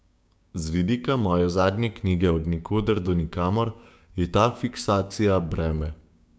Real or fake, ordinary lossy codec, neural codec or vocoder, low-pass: fake; none; codec, 16 kHz, 6 kbps, DAC; none